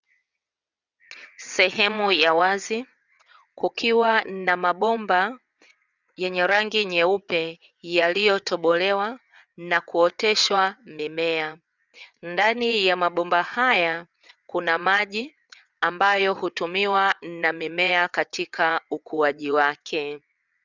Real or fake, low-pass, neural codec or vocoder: fake; 7.2 kHz; vocoder, 22.05 kHz, 80 mel bands, WaveNeXt